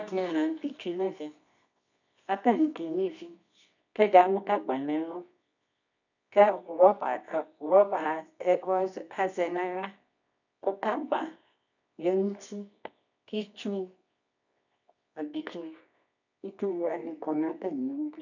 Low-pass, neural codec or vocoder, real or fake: 7.2 kHz; codec, 24 kHz, 0.9 kbps, WavTokenizer, medium music audio release; fake